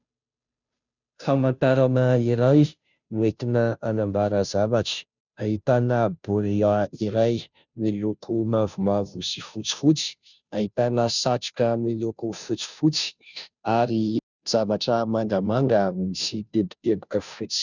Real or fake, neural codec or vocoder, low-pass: fake; codec, 16 kHz, 0.5 kbps, FunCodec, trained on Chinese and English, 25 frames a second; 7.2 kHz